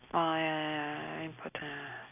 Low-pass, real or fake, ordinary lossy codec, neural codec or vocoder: 3.6 kHz; real; none; none